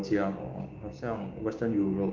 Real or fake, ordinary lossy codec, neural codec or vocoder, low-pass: fake; Opus, 32 kbps; codec, 16 kHz, 6 kbps, DAC; 7.2 kHz